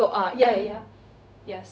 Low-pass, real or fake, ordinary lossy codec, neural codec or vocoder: none; fake; none; codec, 16 kHz, 0.4 kbps, LongCat-Audio-Codec